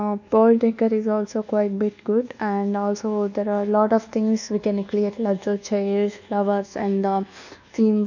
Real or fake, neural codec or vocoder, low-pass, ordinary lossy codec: fake; codec, 24 kHz, 1.2 kbps, DualCodec; 7.2 kHz; none